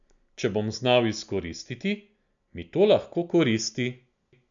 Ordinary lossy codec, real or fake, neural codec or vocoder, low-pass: MP3, 96 kbps; real; none; 7.2 kHz